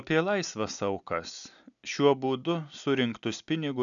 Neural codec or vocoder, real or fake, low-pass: none; real; 7.2 kHz